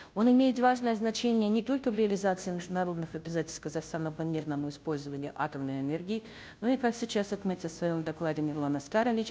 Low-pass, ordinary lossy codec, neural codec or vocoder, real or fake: none; none; codec, 16 kHz, 0.5 kbps, FunCodec, trained on Chinese and English, 25 frames a second; fake